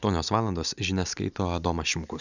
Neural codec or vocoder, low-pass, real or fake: none; 7.2 kHz; real